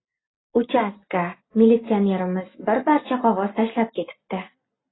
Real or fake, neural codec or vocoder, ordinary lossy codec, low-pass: real; none; AAC, 16 kbps; 7.2 kHz